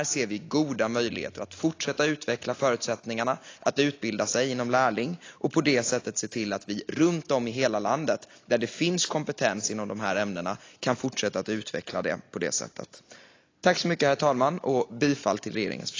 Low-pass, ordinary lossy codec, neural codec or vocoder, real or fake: 7.2 kHz; AAC, 32 kbps; none; real